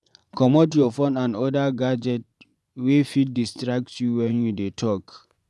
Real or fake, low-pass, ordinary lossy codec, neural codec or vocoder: fake; none; none; vocoder, 24 kHz, 100 mel bands, Vocos